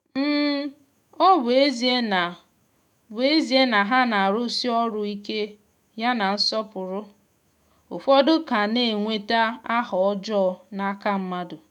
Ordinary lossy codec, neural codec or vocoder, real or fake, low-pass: none; autoencoder, 48 kHz, 128 numbers a frame, DAC-VAE, trained on Japanese speech; fake; 19.8 kHz